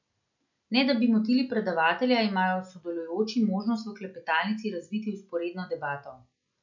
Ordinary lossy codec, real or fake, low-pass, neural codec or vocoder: none; real; 7.2 kHz; none